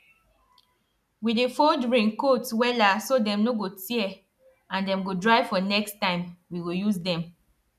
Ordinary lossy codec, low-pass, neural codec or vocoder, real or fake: none; 14.4 kHz; none; real